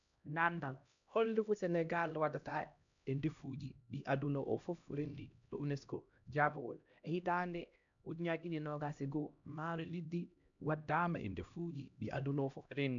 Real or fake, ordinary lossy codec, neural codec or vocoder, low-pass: fake; none; codec, 16 kHz, 1 kbps, X-Codec, HuBERT features, trained on LibriSpeech; 7.2 kHz